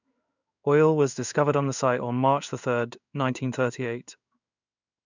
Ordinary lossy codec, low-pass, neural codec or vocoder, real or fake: none; 7.2 kHz; codec, 16 kHz in and 24 kHz out, 1 kbps, XY-Tokenizer; fake